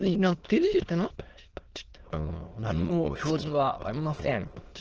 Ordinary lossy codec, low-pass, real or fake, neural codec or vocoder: Opus, 16 kbps; 7.2 kHz; fake; autoencoder, 22.05 kHz, a latent of 192 numbers a frame, VITS, trained on many speakers